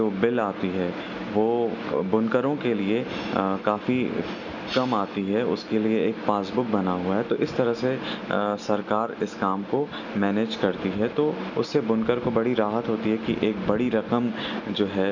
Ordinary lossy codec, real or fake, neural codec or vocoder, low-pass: none; real; none; 7.2 kHz